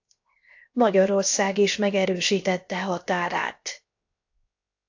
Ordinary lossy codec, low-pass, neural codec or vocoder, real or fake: AAC, 48 kbps; 7.2 kHz; codec, 16 kHz, 0.7 kbps, FocalCodec; fake